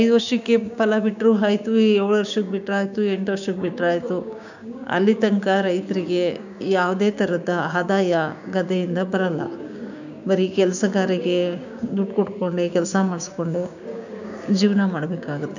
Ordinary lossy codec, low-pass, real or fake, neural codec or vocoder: none; 7.2 kHz; fake; codec, 16 kHz, 6 kbps, DAC